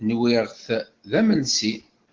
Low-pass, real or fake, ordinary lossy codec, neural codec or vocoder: 7.2 kHz; real; Opus, 16 kbps; none